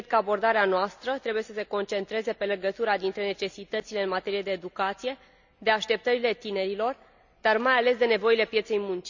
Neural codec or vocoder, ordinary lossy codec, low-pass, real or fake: none; none; 7.2 kHz; real